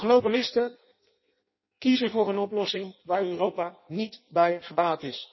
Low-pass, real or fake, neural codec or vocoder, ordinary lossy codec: 7.2 kHz; fake; codec, 16 kHz in and 24 kHz out, 0.6 kbps, FireRedTTS-2 codec; MP3, 24 kbps